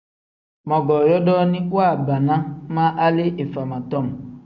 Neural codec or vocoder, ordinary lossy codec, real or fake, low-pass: none; MP3, 64 kbps; real; 7.2 kHz